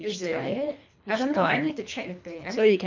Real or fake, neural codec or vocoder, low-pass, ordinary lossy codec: fake; codec, 24 kHz, 3 kbps, HILCodec; 7.2 kHz; MP3, 64 kbps